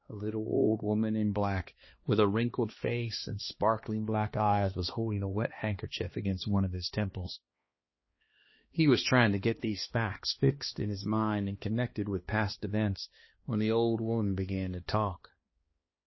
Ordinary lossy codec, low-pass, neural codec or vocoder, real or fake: MP3, 24 kbps; 7.2 kHz; codec, 16 kHz, 2 kbps, X-Codec, HuBERT features, trained on balanced general audio; fake